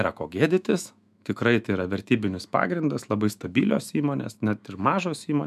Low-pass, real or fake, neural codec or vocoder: 14.4 kHz; fake; autoencoder, 48 kHz, 128 numbers a frame, DAC-VAE, trained on Japanese speech